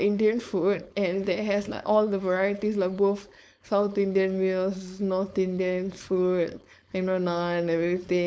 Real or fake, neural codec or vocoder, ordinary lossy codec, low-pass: fake; codec, 16 kHz, 4.8 kbps, FACodec; none; none